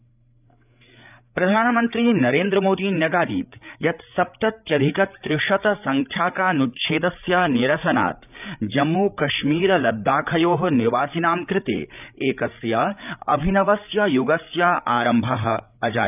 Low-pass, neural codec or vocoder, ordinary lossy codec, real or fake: 3.6 kHz; codec, 16 kHz, 8 kbps, FreqCodec, larger model; none; fake